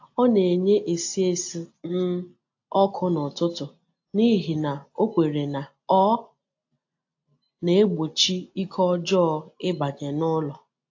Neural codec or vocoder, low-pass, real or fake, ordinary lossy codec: none; 7.2 kHz; real; AAC, 48 kbps